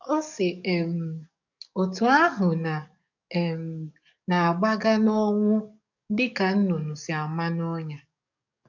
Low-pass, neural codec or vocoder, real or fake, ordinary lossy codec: 7.2 kHz; codec, 44.1 kHz, 7.8 kbps, DAC; fake; none